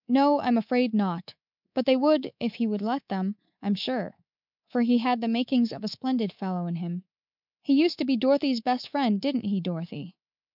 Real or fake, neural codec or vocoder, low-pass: fake; codec, 24 kHz, 3.1 kbps, DualCodec; 5.4 kHz